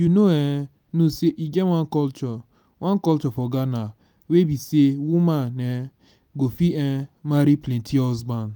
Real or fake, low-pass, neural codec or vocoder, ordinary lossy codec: real; none; none; none